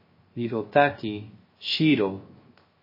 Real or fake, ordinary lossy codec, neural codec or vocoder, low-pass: fake; MP3, 24 kbps; codec, 16 kHz, 0.3 kbps, FocalCodec; 5.4 kHz